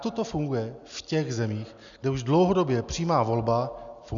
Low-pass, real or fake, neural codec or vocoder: 7.2 kHz; real; none